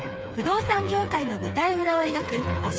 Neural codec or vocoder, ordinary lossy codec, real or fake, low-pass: codec, 16 kHz, 4 kbps, FreqCodec, smaller model; none; fake; none